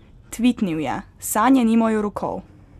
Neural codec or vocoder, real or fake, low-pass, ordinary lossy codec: none; real; 14.4 kHz; none